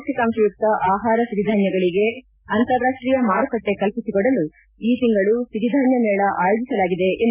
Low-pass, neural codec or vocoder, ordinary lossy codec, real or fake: 3.6 kHz; none; none; real